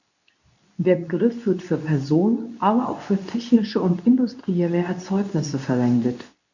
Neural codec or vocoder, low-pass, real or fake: codec, 24 kHz, 0.9 kbps, WavTokenizer, medium speech release version 2; 7.2 kHz; fake